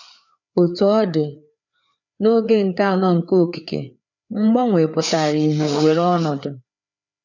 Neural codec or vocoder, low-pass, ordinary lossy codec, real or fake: codec, 16 kHz, 4 kbps, FreqCodec, larger model; 7.2 kHz; none; fake